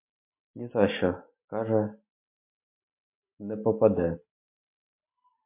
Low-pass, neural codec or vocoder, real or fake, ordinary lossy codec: 3.6 kHz; none; real; MP3, 24 kbps